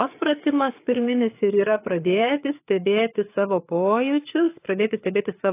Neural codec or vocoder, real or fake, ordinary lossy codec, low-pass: codec, 16 kHz, 4 kbps, FreqCodec, larger model; fake; AAC, 24 kbps; 3.6 kHz